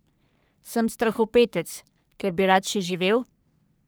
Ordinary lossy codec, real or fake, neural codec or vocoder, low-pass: none; fake; codec, 44.1 kHz, 3.4 kbps, Pupu-Codec; none